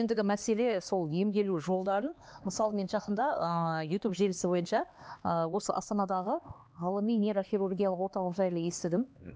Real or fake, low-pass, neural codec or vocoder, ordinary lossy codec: fake; none; codec, 16 kHz, 2 kbps, X-Codec, HuBERT features, trained on LibriSpeech; none